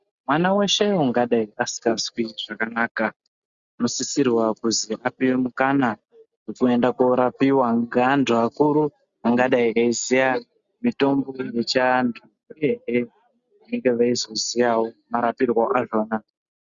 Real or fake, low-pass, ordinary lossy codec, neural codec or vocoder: real; 7.2 kHz; Opus, 64 kbps; none